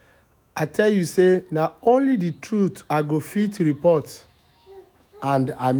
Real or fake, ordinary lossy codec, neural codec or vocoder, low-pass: fake; none; autoencoder, 48 kHz, 128 numbers a frame, DAC-VAE, trained on Japanese speech; none